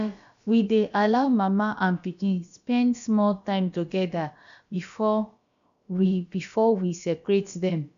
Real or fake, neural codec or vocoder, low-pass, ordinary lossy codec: fake; codec, 16 kHz, about 1 kbps, DyCAST, with the encoder's durations; 7.2 kHz; none